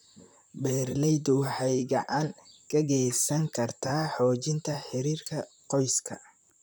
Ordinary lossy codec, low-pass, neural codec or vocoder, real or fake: none; none; vocoder, 44.1 kHz, 128 mel bands, Pupu-Vocoder; fake